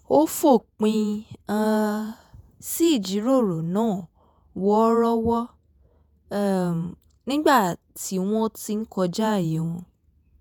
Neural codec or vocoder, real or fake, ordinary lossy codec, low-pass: vocoder, 48 kHz, 128 mel bands, Vocos; fake; none; none